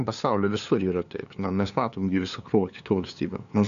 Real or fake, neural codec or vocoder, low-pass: fake; codec, 16 kHz, 2 kbps, FunCodec, trained on LibriTTS, 25 frames a second; 7.2 kHz